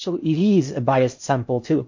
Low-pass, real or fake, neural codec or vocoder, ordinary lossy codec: 7.2 kHz; fake; codec, 16 kHz in and 24 kHz out, 0.6 kbps, FocalCodec, streaming, 4096 codes; MP3, 48 kbps